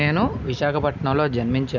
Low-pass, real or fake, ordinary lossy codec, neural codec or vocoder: 7.2 kHz; real; none; none